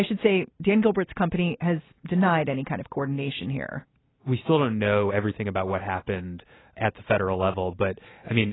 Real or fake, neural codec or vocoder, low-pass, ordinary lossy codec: real; none; 7.2 kHz; AAC, 16 kbps